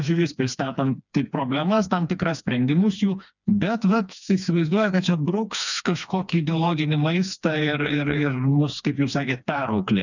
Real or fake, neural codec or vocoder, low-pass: fake; codec, 16 kHz, 2 kbps, FreqCodec, smaller model; 7.2 kHz